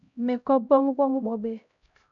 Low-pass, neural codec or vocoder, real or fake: 7.2 kHz; codec, 16 kHz, 0.5 kbps, X-Codec, HuBERT features, trained on LibriSpeech; fake